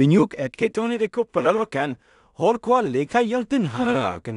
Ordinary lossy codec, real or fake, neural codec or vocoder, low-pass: none; fake; codec, 16 kHz in and 24 kHz out, 0.4 kbps, LongCat-Audio-Codec, two codebook decoder; 10.8 kHz